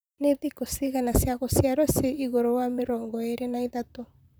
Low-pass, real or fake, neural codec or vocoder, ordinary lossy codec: none; fake; vocoder, 44.1 kHz, 128 mel bands, Pupu-Vocoder; none